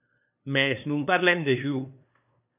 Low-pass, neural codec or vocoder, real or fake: 3.6 kHz; codec, 16 kHz, 2 kbps, FunCodec, trained on LibriTTS, 25 frames a second; fake